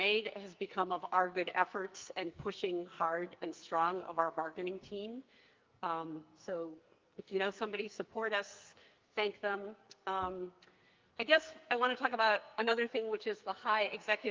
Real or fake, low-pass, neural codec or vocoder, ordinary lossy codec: fake; 7.2 kHz; codec, 44.1 kHz, 2.6 kbps, SNAC; Opus, 32 kbps